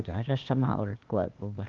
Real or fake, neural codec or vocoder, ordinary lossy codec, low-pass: fake; codec, 16 kHz, 0.8 kbps, ZipCodec; Opus, 32 kbps; 7.2 kHz